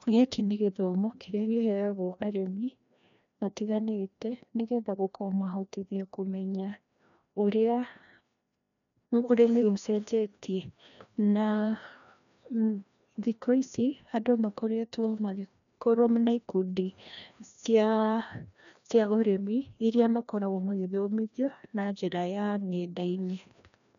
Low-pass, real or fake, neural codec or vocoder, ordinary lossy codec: 7.2 kHz; fake; codec, 16 kHz, 1 kbps, FreqCodec, larger model; none